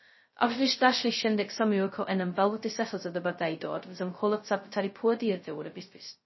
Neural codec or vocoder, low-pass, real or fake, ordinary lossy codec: codec, 16 kHz, 0.2 kbps, FocalCodec; 7.2 kHz; fake; MP3, 24 kbps